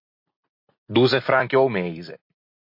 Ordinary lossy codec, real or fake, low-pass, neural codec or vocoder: MP3, 32 kbps; real; 5.4 kHz; none